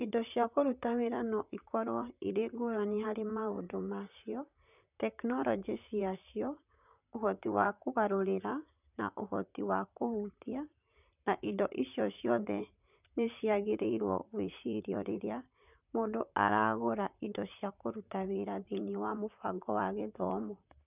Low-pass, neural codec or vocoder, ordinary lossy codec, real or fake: 3.6 kHz; vocoder, 44.1 kHz, 128 mel bands, Pupu-Vocoder; none; fake